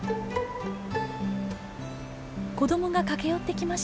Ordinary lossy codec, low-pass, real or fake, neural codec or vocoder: none; none; real; none